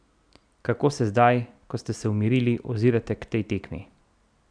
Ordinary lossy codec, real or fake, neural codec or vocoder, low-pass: none; real; none; 9.9 kHz